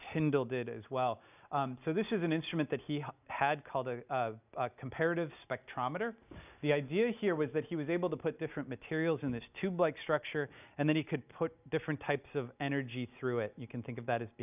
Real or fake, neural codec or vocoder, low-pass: real; none; 3.6 kHz